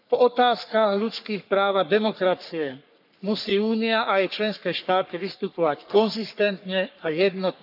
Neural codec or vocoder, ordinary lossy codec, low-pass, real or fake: codec, 44.1 kHz, 3.4 kbps, Pupu-Codec; none; 5.4 kHz; fake